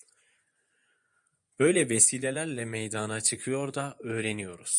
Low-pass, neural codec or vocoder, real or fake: 10.8 kHz; vocoder, 24 kHz, 100 mel bands, Vocos; fake